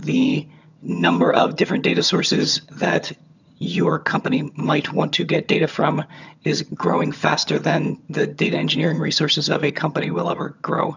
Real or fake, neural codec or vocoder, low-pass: fake; vocoder, 22.05 kHz, 80 mel bands, HiFi-GAN; 7.2 kHz